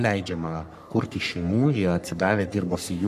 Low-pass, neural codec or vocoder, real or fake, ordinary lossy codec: 14.4 kHz; codec, 44.1 kHz, 3.4 kbps, Pupu-Codec; fake; MP3, 96 kbps